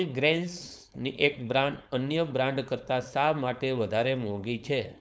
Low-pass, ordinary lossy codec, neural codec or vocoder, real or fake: none; none; codec, 16 kHz, 4.8 kbps, FACodec; fake